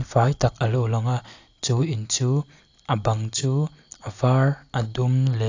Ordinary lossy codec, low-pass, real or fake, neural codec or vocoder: AAC, 48 kbps; 7.2 kHz; real; none